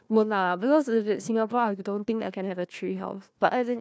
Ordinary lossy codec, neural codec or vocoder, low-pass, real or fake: none; codec, 16 kHz, 1 kbps, FunCodec, trained on Chinese and English, 50 frames a second; none; fake